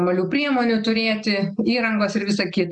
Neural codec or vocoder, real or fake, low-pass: none; real; 9.9 kHz